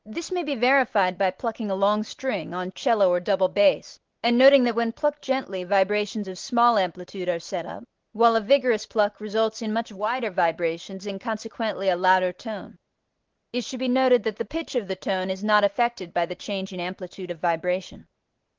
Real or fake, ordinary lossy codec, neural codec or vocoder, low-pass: real; Opus, 16 kbps; none; 7.2 kHz